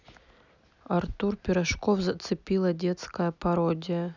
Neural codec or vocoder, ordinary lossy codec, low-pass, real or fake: none; none; 7.2 kHz; real